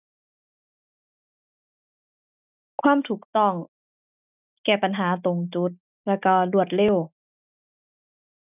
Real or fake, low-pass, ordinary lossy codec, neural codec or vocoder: real; 3.6 kHz; none; none